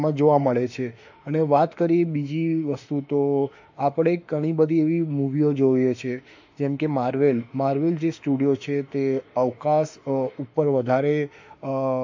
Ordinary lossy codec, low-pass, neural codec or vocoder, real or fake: AAC, 48 kbps; 7.2 kHz; autoencoder, 48 kHz, 32 numbers a frame, DAC-VAE, trained on Japanese speech; fake